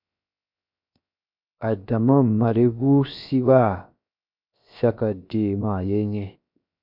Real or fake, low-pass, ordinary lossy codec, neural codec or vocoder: fake; 5.4 kHz; MP3, 48 kbps; codec, 16 kHz, 0.7 kbps, FocalCodec